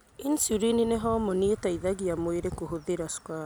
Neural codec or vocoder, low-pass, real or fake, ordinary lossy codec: none; none; real; none